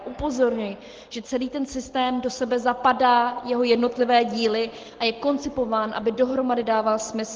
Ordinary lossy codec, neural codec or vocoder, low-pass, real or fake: Opus, 16 kbps; none; 7.2 kHz; real